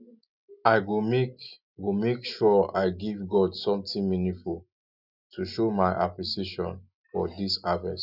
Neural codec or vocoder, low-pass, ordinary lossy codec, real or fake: none; 5.4 kHz; none; real